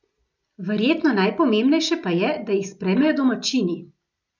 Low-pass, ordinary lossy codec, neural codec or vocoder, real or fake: 7.2 kHz; none; none; real